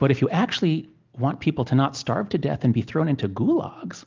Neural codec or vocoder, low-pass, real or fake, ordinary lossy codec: none; 7.2 kHz; real; Opus, 24 kbps